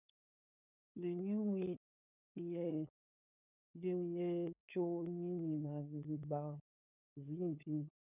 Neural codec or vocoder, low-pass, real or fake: codec, 16 kHz, 8 kbps, FunCodec, trained on LibriTTS, 25 frames a second; 3.6 kHz; fake